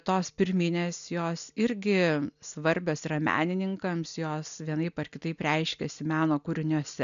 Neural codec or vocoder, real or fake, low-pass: none; real; 7.2 kHz